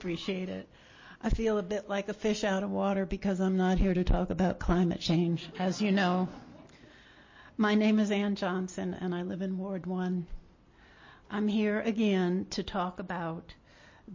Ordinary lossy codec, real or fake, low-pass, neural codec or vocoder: MP3, 32 kbps; real; 7.2 kHz; none